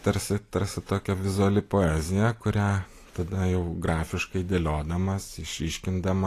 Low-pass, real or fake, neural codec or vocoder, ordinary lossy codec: 14.4 kHz; real; none; AAC, 48 kbps